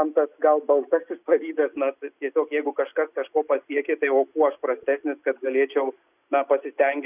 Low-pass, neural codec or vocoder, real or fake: 3.6 kHz; none; real